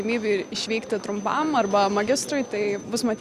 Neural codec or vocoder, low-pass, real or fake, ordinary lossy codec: none; 14.4 kHz; real; Opus, 64 kbps